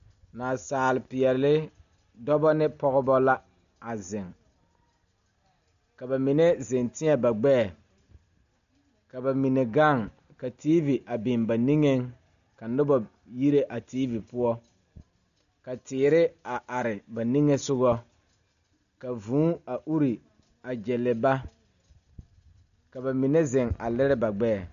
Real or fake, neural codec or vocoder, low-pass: real; none; 7.2 kHz